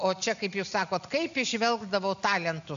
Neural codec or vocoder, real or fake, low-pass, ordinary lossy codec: none; real; 7.2 kHz; AAC, 96 kbps